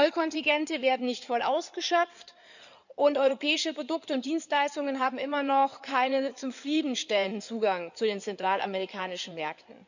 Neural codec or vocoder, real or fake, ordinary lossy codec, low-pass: codec, 16 kHz in and 24 kHz out, 2.2 kbps, FireRedTTS-2 codec; fake; none; 7.2 kHz